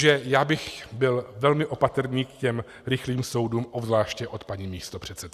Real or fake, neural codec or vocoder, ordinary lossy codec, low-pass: real; none; Opus, 64 kbps; 14.4 kHz